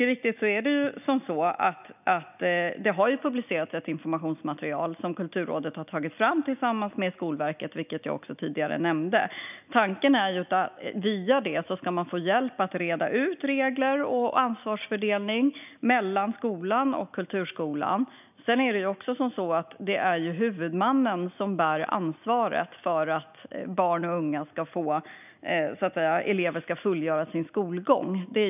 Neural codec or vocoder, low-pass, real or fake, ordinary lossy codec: none; 3.6 kHz; real; none